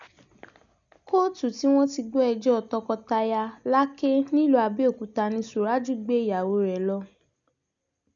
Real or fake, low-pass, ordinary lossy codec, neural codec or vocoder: real; 7.2 kHz; none; none